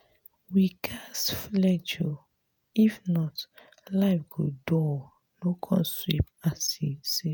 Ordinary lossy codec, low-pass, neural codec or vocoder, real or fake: none; none; vocoder, 48 kHz, 128 mel bands, Vocos; fake